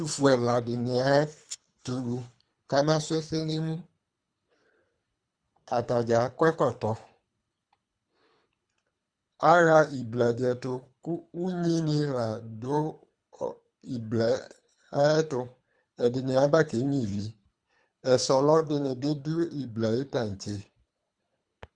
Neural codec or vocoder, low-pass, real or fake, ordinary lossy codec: codec, 24 kHz, 3 kbps, HILCodec; 9.9 kHz; fake; Opus, 64 kbps